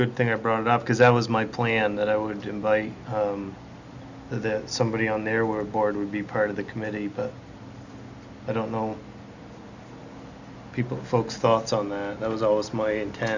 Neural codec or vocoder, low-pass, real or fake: none; 7.2 kHz; real